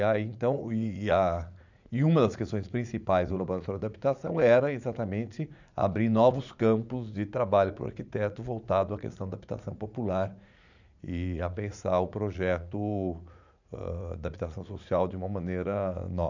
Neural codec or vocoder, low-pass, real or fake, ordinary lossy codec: vocoder, 44.1 kHz, 80 mel bands, Vocos; 7.2 kHz; fake; none